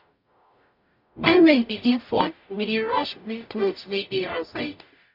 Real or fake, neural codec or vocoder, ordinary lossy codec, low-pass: fake; codec, 44.1 kHz, 0.9 kbps, DAC; MP3, 48 kbps; 5.4 kHz